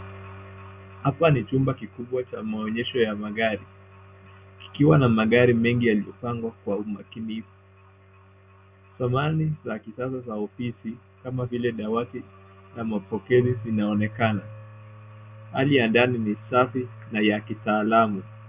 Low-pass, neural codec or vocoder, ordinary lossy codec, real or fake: 3.6 kHz; none; Opus, 64 kbps; real